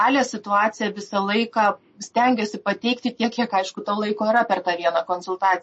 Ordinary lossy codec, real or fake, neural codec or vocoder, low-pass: MP3, 32 kbps; real; none; 9.9 kHz